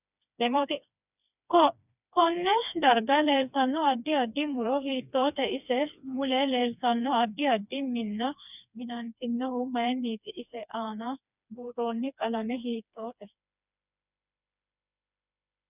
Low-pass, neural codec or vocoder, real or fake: 3.6 kHz; codec, 16 kHz, 2 kbps, FreqCodec, smaller model; fake